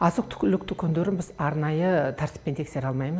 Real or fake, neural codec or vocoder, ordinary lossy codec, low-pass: real; none; none; none